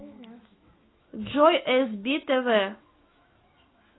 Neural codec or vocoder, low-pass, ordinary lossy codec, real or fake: autoencoder, 48 kHz, 128 numbers a frame, DAC-VAE, trained on Japanese speech; 7.2 kHz; AAC, 16 kbps; fake